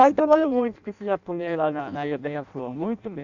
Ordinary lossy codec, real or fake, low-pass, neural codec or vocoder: none; fake; 7.2 kHz; codec, 16 kHz in and 24 kHz out, 0.6 kbps, FireRedTTS-2 codec